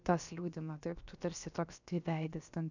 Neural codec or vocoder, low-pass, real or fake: codec, 16 kHz, about 1 kbps, DyCAST, with the encoder's durations; 7.2 kHz; fake